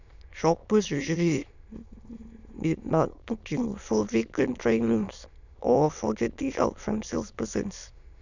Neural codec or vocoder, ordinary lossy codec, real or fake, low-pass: autoencoder, 22.05 kHz, a latent of 192 numbers a frame, VITS, trained on many speakers; none; fake; 7.2 kHz